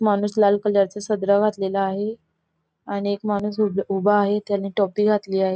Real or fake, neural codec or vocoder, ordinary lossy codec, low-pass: real; none; none; none